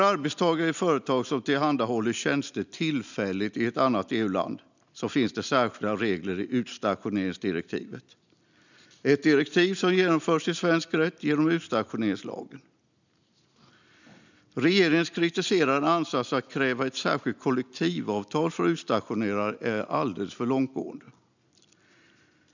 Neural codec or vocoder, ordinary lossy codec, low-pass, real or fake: none; none; 7.2 kHz; real